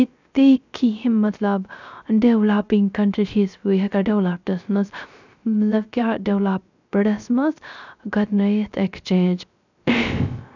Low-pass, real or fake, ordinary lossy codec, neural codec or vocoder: 7.2 kHz; fake; none; codec, 16 kHz, 0.3 kbps, FocalCodec